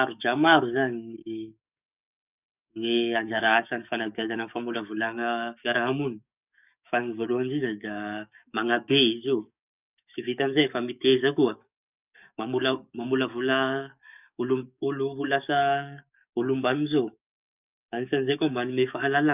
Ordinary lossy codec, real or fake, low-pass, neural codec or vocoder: none; fake; 3.6 kHz; codec, 44.1 kHz, 7.8 kbps, DAC